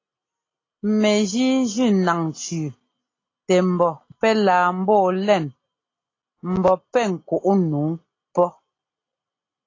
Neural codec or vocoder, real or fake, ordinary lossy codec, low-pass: none; real; AAC, 32 kbps; 7.2 kHz